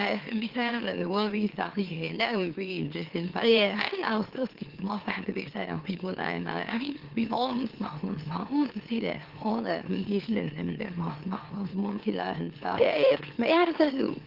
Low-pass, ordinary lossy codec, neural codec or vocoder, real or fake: 5.4 kHz; Opus, 16 kbps; autoencoder, 44.1 kHz, a latent of 192 numbers a frame, MeloTTS; fake